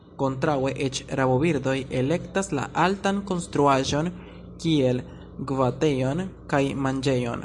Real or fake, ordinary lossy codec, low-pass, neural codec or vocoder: real; Opus, 64 kbps; 10.8 kHz; none